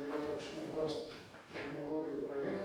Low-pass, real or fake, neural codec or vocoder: 19.8 kHz; fake; codec, 44.1 kHz, 2.6 kbps, DAC